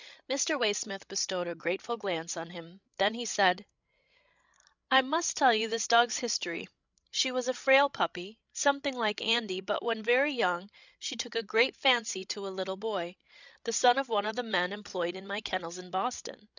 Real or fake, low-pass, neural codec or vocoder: fake; 7.2 kHz; codec, 16 kHz, 16 kbps, FreqCodec, larger model